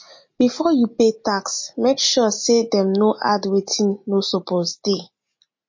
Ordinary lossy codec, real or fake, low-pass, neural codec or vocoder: MP3, 32 kbps; real; 7.2 kHz; none